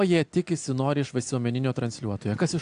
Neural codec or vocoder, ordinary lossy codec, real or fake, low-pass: none; AAC, 64 kbps; real; 9.9 kHz